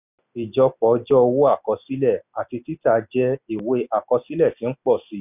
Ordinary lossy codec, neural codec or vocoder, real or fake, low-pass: none; none; real; 3.6 kHz